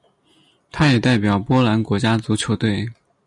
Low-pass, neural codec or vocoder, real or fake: 10.8 kHz; none; real